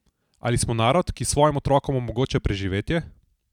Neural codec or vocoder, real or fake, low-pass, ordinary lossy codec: none; real; 19.8 kHz; none